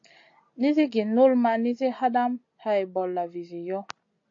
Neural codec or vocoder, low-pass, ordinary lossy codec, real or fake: none; 7.2 kHz; AAC, 48 kbps; real